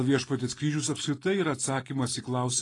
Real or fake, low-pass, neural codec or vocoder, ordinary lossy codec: real; 10.8 kHz; none; AAC, 32 kbps